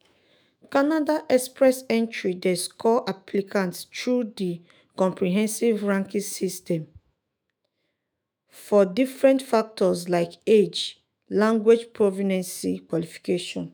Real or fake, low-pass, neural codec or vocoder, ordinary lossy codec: fake; none; autoencoder, 48 kHz, 128 numbers a frame, DAC-VAE, trained on Japanese speech; none